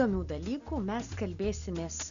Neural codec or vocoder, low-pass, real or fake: none; 7.2 kHz; real